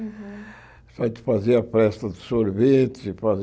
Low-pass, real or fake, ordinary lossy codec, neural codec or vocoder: none; real; none; none